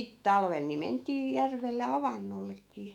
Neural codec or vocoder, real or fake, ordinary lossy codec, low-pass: autoencoder, 48 kHz, 128 numbers a frame, DAC-VAE, trained on Japanese speech; fake; none; 19.8 kHz